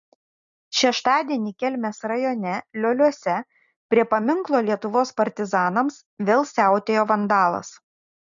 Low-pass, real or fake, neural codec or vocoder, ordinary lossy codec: 7.2 kHz; real; none; MP3, 96 kbps